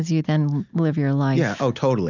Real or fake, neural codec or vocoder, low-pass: real; none; 7.2 kHz